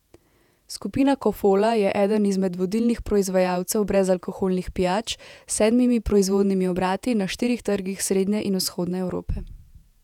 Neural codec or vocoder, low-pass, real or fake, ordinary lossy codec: vocoder, 48 kHz, 128 mel bands, Vocos; 19.8 kHz; fake; none